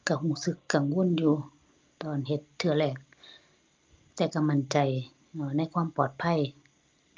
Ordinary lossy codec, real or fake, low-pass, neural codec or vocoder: Opus, 24 kbps; real; 7.2 kHz; none